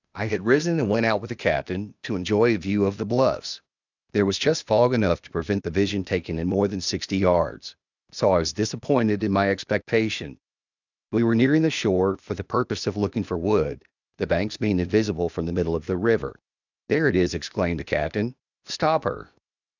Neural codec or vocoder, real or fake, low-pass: codec, 16 kHz, 0.8 kbps, ZipCodec; fake; 7.2 kHz